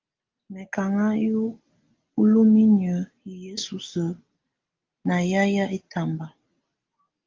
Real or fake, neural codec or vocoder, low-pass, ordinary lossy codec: real; none; 7.2 kHz; Opus, 32 kbps